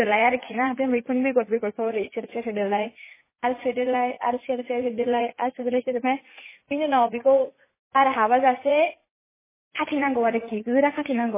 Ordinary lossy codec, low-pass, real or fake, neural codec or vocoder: MP3, 16 kbps; 3.6 kHz; fake; vocoder, 44.1 kHz, 80 mel bands, Vocos